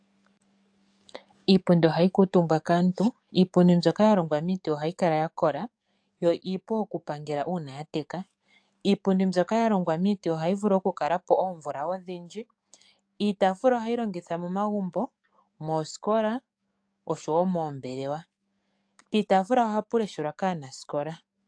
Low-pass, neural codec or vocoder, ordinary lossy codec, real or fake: 9.9 kHz; codec, 44.1 kHz, 7.8 kbps, DAC; AAC, 64 kbps; fake